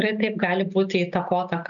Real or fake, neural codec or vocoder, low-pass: real; none; 7.2 kHz